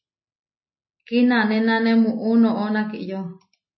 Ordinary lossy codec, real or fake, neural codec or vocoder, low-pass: MP3, 24 kbps; real; none; 7.2 kHz